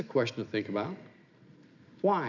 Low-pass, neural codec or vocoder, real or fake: 7.2 kHz; none; real